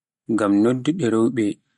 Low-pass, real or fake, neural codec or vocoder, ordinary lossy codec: 19.8 kHz; real; none; MP3, 48 kbps